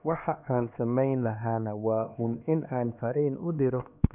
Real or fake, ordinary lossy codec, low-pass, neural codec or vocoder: fake; none; 3.6 kHz; codec, 16 kHz, 2 kbps, X-Codec, HuBERT features, trained on LibriSpeech